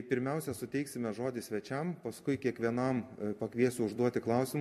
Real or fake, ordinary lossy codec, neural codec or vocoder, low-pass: fake; MP3, 64 kbps; vocoder, 44.1 kHz, 128 mel bands every 256 samples, BigVGAN v2; 14.4 kHz